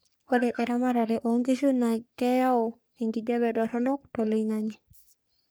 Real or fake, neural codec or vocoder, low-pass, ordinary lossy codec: fake; codec, 44.1 kHz, 3.4 kbps, Pupu-Codec; none; none